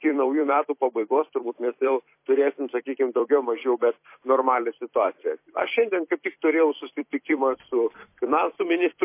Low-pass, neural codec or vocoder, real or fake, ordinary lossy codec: 3.6 kHz; vocoder, 44.1 kHz, 128 mel bands every 256 samples, BigVGAN v2; fake; MP3, 24 kbps